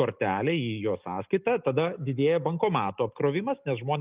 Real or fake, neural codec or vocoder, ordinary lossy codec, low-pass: real; none; Opus, 32 kbps; 3.6 kHz